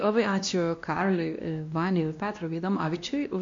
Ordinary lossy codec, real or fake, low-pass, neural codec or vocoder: MP3, 48 kbps; fake; 7.2 kHz; codec, 16 kHz, 1 kbps, X-Codec, WavLM features, trained on Multilingual LibriSpeech